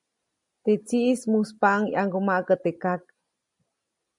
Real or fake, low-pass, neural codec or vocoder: real; 10.8 kHz; none